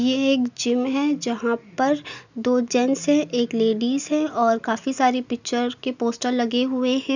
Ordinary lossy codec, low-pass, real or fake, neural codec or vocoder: none; 7.2 kHz; real; none